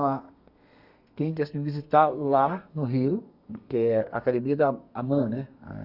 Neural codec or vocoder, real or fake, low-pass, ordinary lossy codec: codec, 32 kHz, 1.9 kbps, SNAC; fake; 5.4 kHz; Opus, 64 kbps